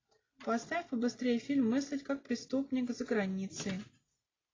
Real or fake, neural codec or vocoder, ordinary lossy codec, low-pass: real; none; AAC, 32 kbps; 7.2 kHz